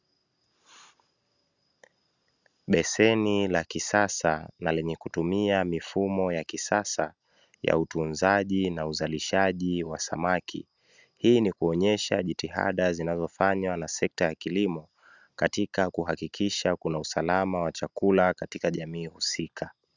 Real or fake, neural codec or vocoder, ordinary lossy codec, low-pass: real; none; Opus, 64 kbps; 7.2 kHz